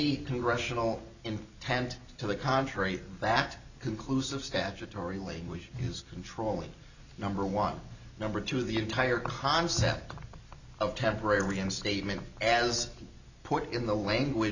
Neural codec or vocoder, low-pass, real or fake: none; 7.2 kHz; real